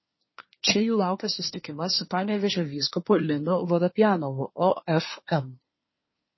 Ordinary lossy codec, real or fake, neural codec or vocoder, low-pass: MP3, 24 kbps; fake; codec, 24 kHz, 1 kbps, SNAC; 7.2 kHz